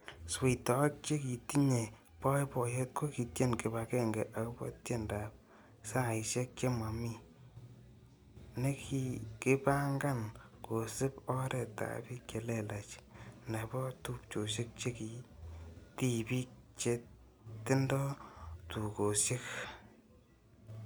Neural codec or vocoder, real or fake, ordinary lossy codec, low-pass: none; real; none; none